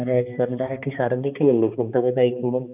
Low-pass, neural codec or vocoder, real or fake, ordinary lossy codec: 3.6 kHz; codec, 16 kHz, 2 kbps, X-Codec, HuBERT features, trained on balanced general audio; fake; none